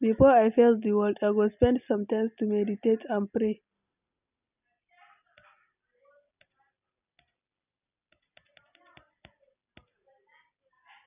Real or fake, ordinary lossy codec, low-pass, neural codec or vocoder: real; none; 3.6 kHz; none